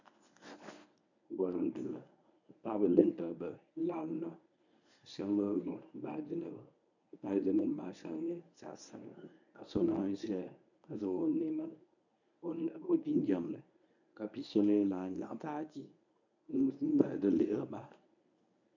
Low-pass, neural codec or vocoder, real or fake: 7.2 kHz; codec, 24 kHz, 0.9 kbps, WavTokenizer, medium speech release version 1; fake